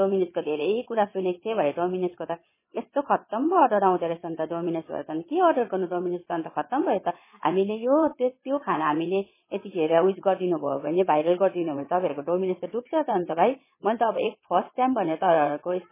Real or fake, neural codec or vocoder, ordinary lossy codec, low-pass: fake; vocoder, 22.05 kHz, 80 mel bands, WaveNeXt; MP3, 16 kbps; 3.6 kHz